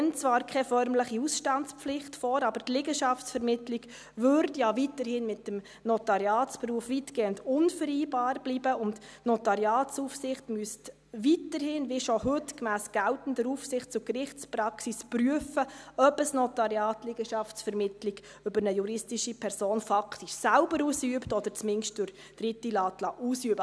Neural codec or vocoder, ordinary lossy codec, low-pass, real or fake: none; none; none; real